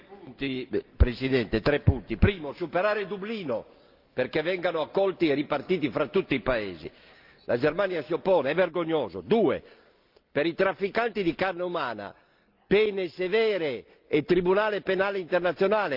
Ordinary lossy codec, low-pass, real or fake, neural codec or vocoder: Opus, 24 kbps; 5.4 kHz; real; none